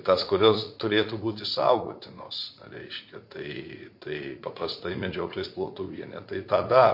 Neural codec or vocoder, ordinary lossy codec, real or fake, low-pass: vocoder, 44.1 kHz, 80 mel bands, Vocos; MP3, 32 kbps; fake; 5.4 kHz